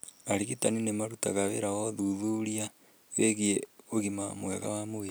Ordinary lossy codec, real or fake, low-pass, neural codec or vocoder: none; real; none; none